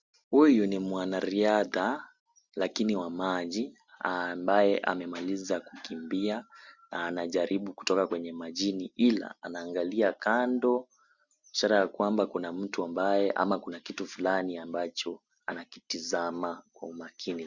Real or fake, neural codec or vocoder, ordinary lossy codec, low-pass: real; none; Opus, 64 kbps; 7.2 kHz